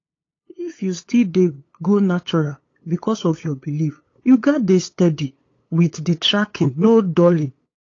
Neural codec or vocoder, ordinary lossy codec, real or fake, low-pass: codec, 16 kHz, 8 kbps, FunCodec, trained on LibriTTS, 25 frames a second; AAC, 32 kbps; fake; 7.2 kHz